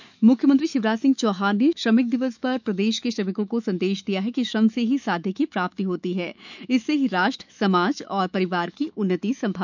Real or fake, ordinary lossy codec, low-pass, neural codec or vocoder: fake; none; 7.2 kHz; autoencoder, 48 kHz, 128 numbers a frame, DAC-VAE, trained on Japanese speech